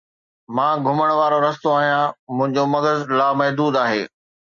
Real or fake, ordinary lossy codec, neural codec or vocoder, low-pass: real; MP3, 64 kbps; none; 7.2 kHz